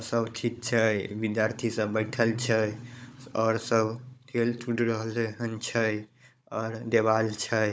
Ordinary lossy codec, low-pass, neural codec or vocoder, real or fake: none; none; codec, 16 kHz, 16 kbps, FunCodec, trained on Chinese and English, 50 frames a second; fake